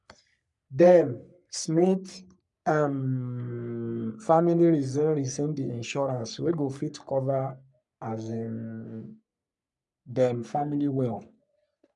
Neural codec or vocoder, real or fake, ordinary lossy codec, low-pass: codec, 44.1 kHz, 3.4 kbps, Pupu-Codec; fake; none; 10.8 kHz